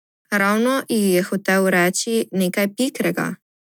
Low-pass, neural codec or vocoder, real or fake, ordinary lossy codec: none; none; real; none